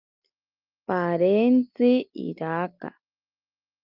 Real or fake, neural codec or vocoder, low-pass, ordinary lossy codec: real; none; 5.4 kHz; Opus, 32 kbps